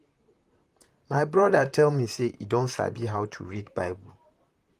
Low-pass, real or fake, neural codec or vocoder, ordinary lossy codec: 14.4 kHz; fake; vocoder, 44.1 kHz, 128 mel bands, Pupu-Vocoder; Opus, 32 kbps